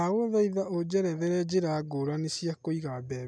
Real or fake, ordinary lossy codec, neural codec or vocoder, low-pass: real; none; none; none